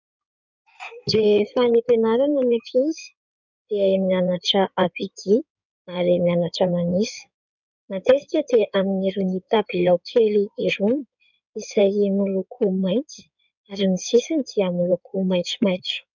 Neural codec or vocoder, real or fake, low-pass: codec, 16 kHz in and 24 kHz out, 2.2 kbps, FireRedTTS-2 codec; fake; 7.2 kHz